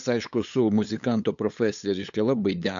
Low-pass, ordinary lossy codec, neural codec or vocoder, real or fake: 7.2 kHz; MP3, 64 kbps; codec, 16 kHz, 8 kbps, FunCodec, trained on LibriTTS, 25 frames a second; fake